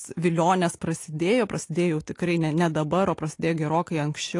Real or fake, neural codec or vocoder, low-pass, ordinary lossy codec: real; none; 10.8 kHz; AAC, 48 kbps